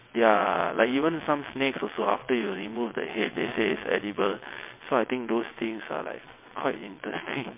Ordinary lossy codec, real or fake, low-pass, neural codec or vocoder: MP3, 24 kbps; fake; 3.6 kHz; vocoder, 22.05 kHz, 80 mel bands, WaveNeXt